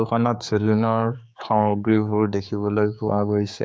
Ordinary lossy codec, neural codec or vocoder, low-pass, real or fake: none; codec, 16 kHz, 4 kbps, X-Codec, HuBERT features, trained on general audio; none; fake